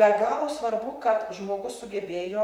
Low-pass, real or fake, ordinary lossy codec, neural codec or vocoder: 19.8 kHz; fake; MP3, 96 kbps; vocoder, 44.1 kHz, 128 mel bands, Pupu-Vocoder